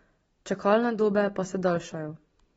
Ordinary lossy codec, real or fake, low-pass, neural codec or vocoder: AAC, 24 kbps; real; 14.4 kHz; none